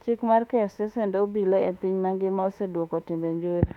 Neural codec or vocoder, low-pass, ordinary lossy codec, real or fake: autoencoder, 48 kHz, 32 numbers a frame, DAC-VAE, trained on Japanese speech; 19.8 kHz; none; fake